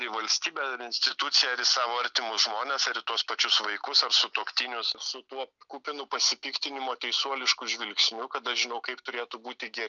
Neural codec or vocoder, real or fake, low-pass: none; real; 7.2 kHz